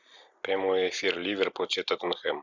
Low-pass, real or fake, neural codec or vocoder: 7.2 kHz; real; none